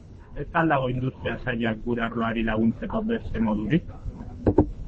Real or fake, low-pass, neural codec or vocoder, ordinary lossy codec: fake; 10.8 kHz; codec, 24 kHz, 3 kbps, HILCodec; MP3, 32 kbps